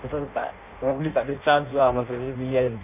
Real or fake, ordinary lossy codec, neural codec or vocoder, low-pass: fake; none; codec, 16 kHz in and 24 kHz out, 0.6 kbps, FireRedTTS-2 codec; 3.6 kHz